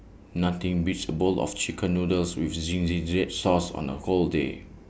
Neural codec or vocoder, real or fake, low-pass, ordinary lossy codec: none; real; none; none